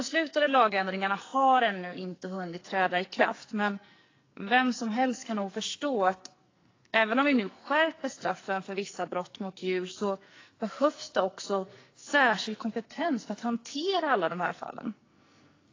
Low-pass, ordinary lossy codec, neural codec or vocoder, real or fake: 7.2 kHz; AAC, 32 kbps; codec, 44.1 kHz, 2.6 kbps, SNAC; fake